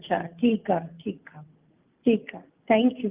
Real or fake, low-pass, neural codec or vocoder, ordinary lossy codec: real; 3.6 kHz; none; Opus, 24 kbps